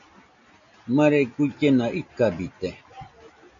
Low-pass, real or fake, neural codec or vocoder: 7.2 kHz; real; none